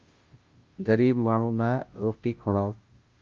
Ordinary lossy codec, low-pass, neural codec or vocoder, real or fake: Opus, 24 kbps; 7.2 kHz; codec, 16 kHz, 0.5 kbps, FunCodec, trained on Chinese and English, 25 frames a second; fake